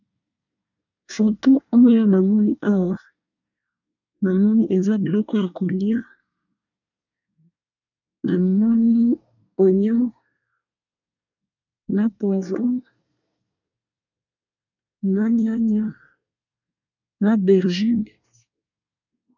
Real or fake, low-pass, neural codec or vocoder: fake; 7.2 kHz; codec, 24 kHz, 1 kbps, SNAC